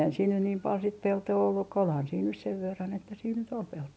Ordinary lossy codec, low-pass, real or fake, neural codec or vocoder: none; none; real; none